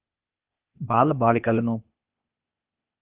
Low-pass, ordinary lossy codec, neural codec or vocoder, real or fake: 3.6 kHz; Opus, 24 kbps; codec, 16 kHz, 0.8 kbps, ZipCodec; fake